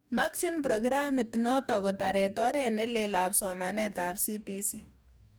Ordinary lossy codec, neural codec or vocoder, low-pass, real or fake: none; codec, 44.1 kHz, 2.6 kbps, DAC; none; fake